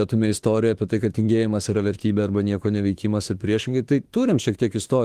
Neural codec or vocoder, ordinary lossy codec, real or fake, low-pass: autoencoder, 48 kHz, 32 numbers a frame, DAC-VAE, trained on Japanese speech; Opus, 24 kbps; fake; 14.4 kHz